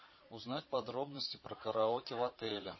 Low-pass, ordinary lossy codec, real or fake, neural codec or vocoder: 7.2 kHz; MP3, 24 kbps; fake; vocoder, 22.05 kHz, 80 mel bands, Vocos